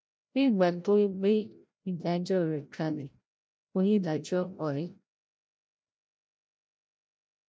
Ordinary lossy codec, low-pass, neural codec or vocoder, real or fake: none; none; codec, 16 kHz, 0.5 kbps, FreqCodec, larger model; fake